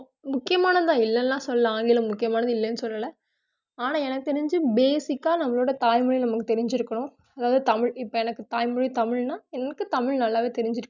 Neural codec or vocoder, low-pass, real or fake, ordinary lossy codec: none; 7.2 kHz; real; none